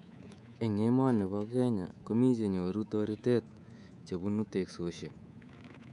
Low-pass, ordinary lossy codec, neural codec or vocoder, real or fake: 10.8 kHz; none; codec, 24 kHz, 3.1 kbps, DualCodec; fake